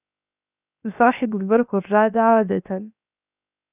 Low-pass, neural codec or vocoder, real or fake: 3.6 kHz; codec, 16 kHz, 0.7 kbps, FocalCodec; fake